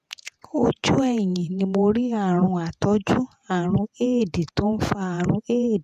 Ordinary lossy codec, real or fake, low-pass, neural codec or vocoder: none; fake; 14.4 kHz; vocoder, 48 kHz, 128 mel bands, Vocos